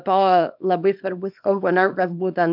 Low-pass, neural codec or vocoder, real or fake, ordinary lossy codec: 5.4 kHz; codec, 24 kHz, 0.9 kbps, WavTokenizer, small release; fake; MP3, 48 kbps